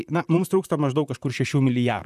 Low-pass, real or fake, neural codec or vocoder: 14.4 kHz; fake; vocoder, 44.1 kHz, 128 mel bands, Pupu-Vocoder